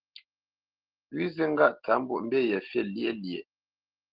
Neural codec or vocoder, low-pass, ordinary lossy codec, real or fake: none; 5.4 kHz; Opus, 16 kbps; real